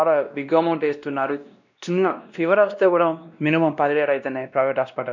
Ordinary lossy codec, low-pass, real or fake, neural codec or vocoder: none; 7.2 kHz; fake; codec, 16 kHz, 1 kbps, X-Codec, WavLM features, trained on Multilingual LibriSpeech